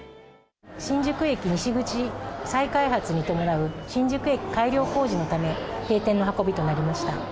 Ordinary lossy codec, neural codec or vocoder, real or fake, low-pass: none; none; real; none